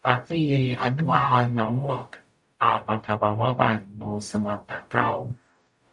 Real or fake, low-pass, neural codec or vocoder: fake; 10.8 kHz; codec, 44.1 kHz, 0.9 kbps, DAC